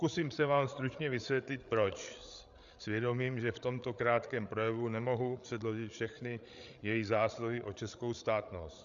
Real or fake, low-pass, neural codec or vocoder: fake; 7.2 kHz; codec, 16 kHz, 8 kbps, FreqCodec, larger model